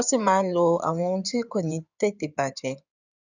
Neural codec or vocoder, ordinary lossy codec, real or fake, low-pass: codec, 16 kHz in and 24 kHz out, 2.2 kbps, FireRedTTS-2 codec; none; fake; 7.2 kHz